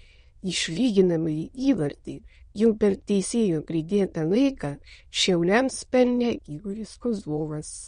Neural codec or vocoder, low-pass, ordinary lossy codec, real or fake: autoencoder, 22.05 kHz, a latent of 192 numbers a frame, VITS, trained on many speakers; 9.9 kHz; MP3, 48 kbps; fake